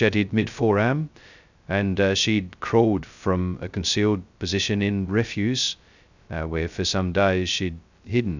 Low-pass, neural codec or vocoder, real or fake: 7.2 kHz; codec, 16 kHz, 0.2 kbps, FocalCodec; fake